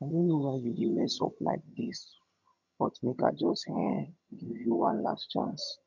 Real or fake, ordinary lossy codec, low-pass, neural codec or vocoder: fake; none; 7.2 kHz; vocoder, 22.05 kHz, 80 mel bands, HiFi-GAN